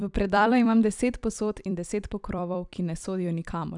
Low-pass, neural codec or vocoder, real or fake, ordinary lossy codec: 10.8 kHz; vocoder, 44.1 kHz, 128 mel bands every 256 samples, BigVGAN v2; fake; none